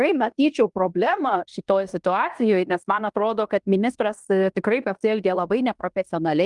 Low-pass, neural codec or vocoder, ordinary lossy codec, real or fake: 10.8 kHz; codec, 16 kHz in and 24 kHz out, 0.9 kbps, LongCat-Audio-Codec, fine tuned four codebook decoder; Opus, 32 kbps; fake